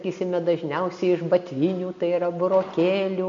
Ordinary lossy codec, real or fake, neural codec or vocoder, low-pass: MP3, 48 kbps; real; none; 7.2 kHz